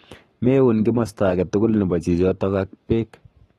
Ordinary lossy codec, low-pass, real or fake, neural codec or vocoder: AAC, 32 kbps; 19.8 kHz; fake; codec, 44.1 kHz, 7.8 kbps, Pupu-Codec